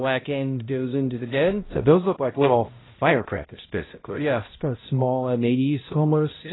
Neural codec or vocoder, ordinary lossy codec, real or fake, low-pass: codec, 16 kHz, 0.5 kbps, X-Codec, HuBERT features, trained on balanced general audio; AAC, 16 kbps; fake; 7.2 kHz